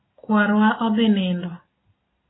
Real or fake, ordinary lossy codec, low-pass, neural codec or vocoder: real; AAC, 16 kbps; 7.2 kHz; none